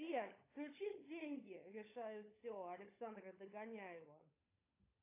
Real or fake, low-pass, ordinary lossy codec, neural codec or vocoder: fake; 3.6 kHz; AAC, 24 kbps; codec, 16 kHz, 16 kbps, FunCodec, trained on LibriTTS, 50 frames a second